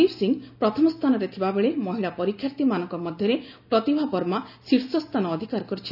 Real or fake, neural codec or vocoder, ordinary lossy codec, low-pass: real; none; none; 5.4 kHz